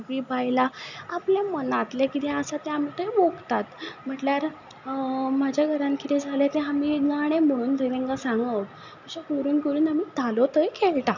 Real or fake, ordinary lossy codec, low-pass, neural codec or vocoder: real; none; 7.2 kHz; none